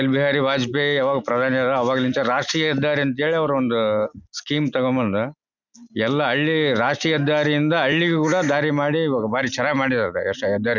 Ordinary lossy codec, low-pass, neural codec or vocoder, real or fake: none; 7.2 kHz; none; real